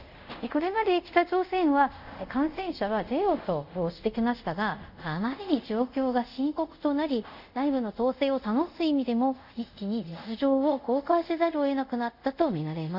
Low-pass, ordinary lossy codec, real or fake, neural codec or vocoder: 5.4 kHz; none; fake; codec, 24 kHz, 0.5 kbps, DualCodec